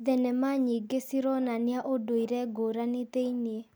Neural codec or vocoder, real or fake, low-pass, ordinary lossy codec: none; real; none; none